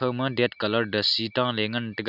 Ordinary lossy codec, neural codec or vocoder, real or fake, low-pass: none; none; real; 5.4 kHz